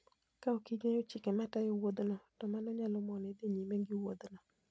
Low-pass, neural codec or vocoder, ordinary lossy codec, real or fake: none; none; none; real